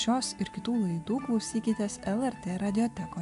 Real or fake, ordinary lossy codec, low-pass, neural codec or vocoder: real; AAC, 96 kbps; 10.8 kHz; none